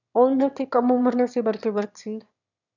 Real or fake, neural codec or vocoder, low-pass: fake; autoencoder, 22.05 kHz, a latent of 192 numbers a frame, VITS, trained on one speaker; 7.2 kHz